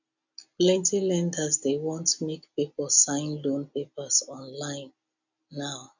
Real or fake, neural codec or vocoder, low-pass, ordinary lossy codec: real; none; 7.2 kHz; none